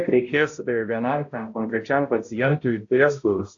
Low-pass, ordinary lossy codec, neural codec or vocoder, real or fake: 7.2 kHz; AAC, 48 kbps; codec, 16 kHz, 0.5 kbps, X-Codec, HuBERT features, trained on balanced general audio; fake